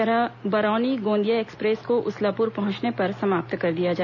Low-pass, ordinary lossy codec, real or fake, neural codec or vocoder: 7.2 kHz; none; real; none